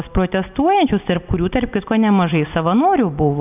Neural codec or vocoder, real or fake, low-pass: none; real; 3.6 kHz